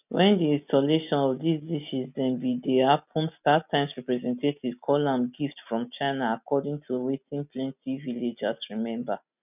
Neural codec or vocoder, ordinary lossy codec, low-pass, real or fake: none; none; 3.6 kHz; real